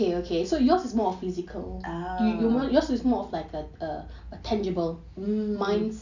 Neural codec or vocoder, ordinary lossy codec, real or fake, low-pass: none; none; real; 7.2 kHz